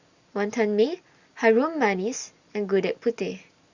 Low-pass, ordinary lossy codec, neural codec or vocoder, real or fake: 7.2 kHz; Opus, 64 kbps; none; real